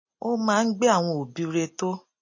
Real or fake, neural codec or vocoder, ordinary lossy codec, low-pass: real; none; MP3, 32 kbps; 7.2 kHz